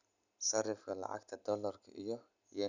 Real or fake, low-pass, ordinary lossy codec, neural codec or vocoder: real; 7.2 kHz; none; none